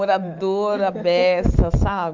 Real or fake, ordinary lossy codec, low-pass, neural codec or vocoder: fake; Opus, 32 kbps; 7.2 kHz; autoencoder, 48 kHz, 128 numbers a frame, DAC-VAE, trained on Japanese speech